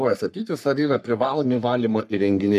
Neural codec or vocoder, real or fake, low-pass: codec, 44.1 kHz, 3.4 kbps, Pupu-Codec; fake; 14.4 kHz